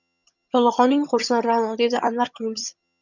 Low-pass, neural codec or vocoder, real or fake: 7.2 kHz; vocoder, 22.05 kHz, 80 mel bands, HiFi-GAN; fake